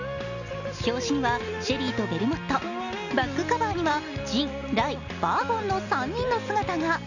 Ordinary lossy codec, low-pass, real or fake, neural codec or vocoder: none; 7.2 kHz; real; none